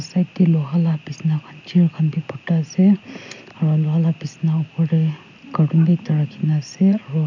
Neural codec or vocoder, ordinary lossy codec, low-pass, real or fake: none; none; 7.2 kHz; real